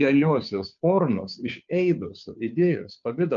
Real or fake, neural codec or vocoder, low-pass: fake; codec, 16 kHz, 2 kbps, FunCodec, trained on Chinese and English, 25 frames a second; 7.2 kHz